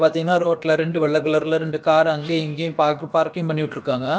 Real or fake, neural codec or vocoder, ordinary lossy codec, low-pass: fake; codec, 16 kHz, about 1 kbps, DyCAST, with the encoder's durations; none; none